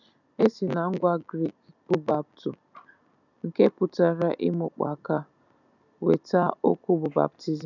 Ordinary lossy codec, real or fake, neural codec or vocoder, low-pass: none; real; none; 7.2 kHz